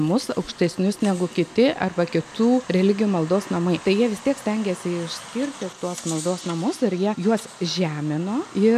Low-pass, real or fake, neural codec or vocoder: 14.4 kHz; real; none